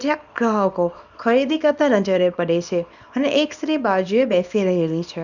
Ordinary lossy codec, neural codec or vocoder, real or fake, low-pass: none; codec, 24 kHz, 0.9 kbps, WavTokenizer, small release; fake; 7.2 kHz